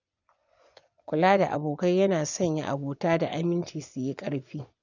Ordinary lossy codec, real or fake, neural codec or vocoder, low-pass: none; fake; vocoder, 24 kHz, 100 mel bands, Vocos; 7.2 kHz